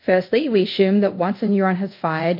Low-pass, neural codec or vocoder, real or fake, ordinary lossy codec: 5.4 kHz; codec, 24 kHz, 0.5 kbps, DualCodec; fake; MP3, 32 kbps